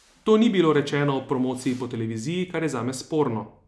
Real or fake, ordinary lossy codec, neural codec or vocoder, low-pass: real; none; none; none